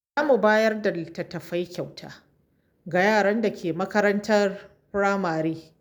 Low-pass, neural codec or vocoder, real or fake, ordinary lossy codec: none; none; real; none